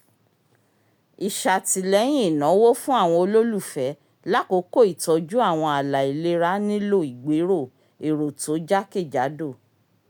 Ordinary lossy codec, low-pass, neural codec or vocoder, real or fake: none; none; none; real